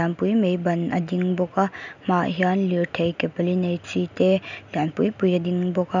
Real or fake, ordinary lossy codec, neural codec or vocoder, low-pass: real; none; none; 7.2 kHz